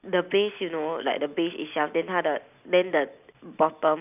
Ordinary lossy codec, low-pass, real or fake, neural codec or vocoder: none; 3.6 kHz; real; none